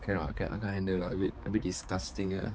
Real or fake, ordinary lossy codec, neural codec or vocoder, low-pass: fake; none; codec, 16 kHz, 4 kbps, X-Codec, HuBERT features, trained on balanced general audio; none